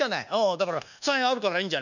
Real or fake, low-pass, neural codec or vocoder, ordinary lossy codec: fake; 7.2 kHz; codec, 24 kHz, 1.2 kbps, DualCodec; none